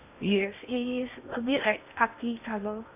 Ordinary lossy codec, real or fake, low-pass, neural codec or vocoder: none; fake; 3.6 kHz; codec, 16 kHz in and 24 kHz out, 0.6 kbps, FocalCodec, streaming, 2048 codes